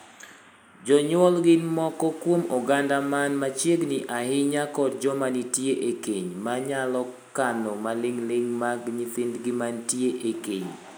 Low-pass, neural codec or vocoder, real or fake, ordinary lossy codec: none; none; real; none